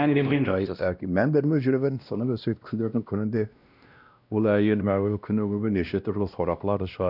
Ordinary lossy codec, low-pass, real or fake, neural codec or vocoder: none; 5.4 kHz; fake; codec, 16 kHz, 1 kbps, X-Codec, WavLM features, trained on Multilingual LibriSpeech